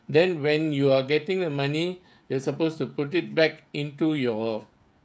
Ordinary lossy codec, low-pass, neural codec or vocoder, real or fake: none; none; codec, 16 kHz, 16 kbps, FreqCodec, smaller model; fake